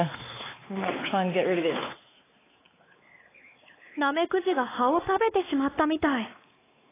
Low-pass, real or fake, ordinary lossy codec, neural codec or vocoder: 3.6 kHz; fake; AAC, 16 kbps; codec, 16 kHz, 4 kbps, X-Codec, WavLM features, trained on Multilingual LibriSpeech